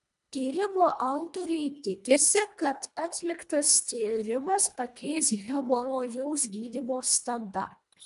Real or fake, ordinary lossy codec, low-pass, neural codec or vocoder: fake; AAC, 96 kbps; 10.8 kHz; codec, 24 kHz, 1.5 kbps, HILCodec